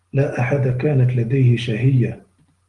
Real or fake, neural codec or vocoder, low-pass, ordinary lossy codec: real; none; 10.8 kHz; Opus, 24 kbps